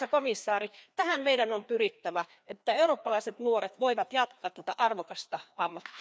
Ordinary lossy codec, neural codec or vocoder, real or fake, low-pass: none; codec, 16 kHz, 2 kbps, FreqCodec, larger model; fake; none